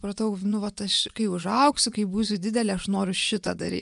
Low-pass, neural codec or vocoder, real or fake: 10.8 kHz; vocoder, 24 kHz, 100 mel bands, Vocos; fake